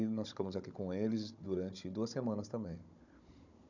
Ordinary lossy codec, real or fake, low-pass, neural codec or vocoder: none; fake; 7.2 kHz; codec, 16 kHz, 16 kbps, FunCodec, trained on Chinese and English, 50 frames a second